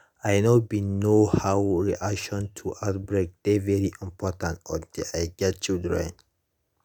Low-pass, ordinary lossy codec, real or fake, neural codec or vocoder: none; none; real; none